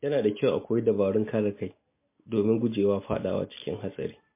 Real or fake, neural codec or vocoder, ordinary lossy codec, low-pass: real; none; MP3, 24 kbps; 3.6 kHz